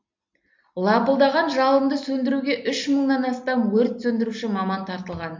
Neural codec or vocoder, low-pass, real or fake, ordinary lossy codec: none; 7.2 kHz; real; MP3, 48 kbps